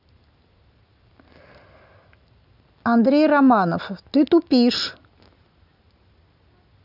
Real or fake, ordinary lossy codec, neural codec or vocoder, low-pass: real; none; none; 5.4 kHz